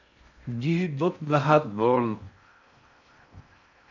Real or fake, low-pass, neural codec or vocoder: fake; 7.2 kHz; codec, 16 kHz in and 24 kHz out, 0.6 kbps, FocalCodec, streaming, 4096 codes